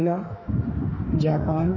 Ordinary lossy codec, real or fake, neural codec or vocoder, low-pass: none; fake; autoencoder, 48 kHz, 32 numbers a frame, DAC-VAE, trained on Japanese speech; 7.2 kHz